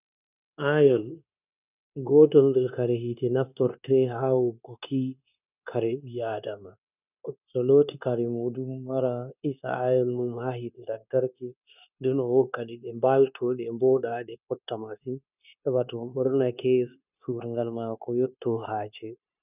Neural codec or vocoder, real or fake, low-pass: codec, 16 kHz, 0.9 kbps, LongCat-Audio-Codec; fake; 3.6 kHz